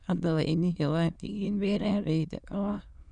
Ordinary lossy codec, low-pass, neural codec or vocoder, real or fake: none; 9.9 kHz; autoencoder, 22.05 kHz, a latent of 192 numbers a frame, VITS, trained on many speakers; fake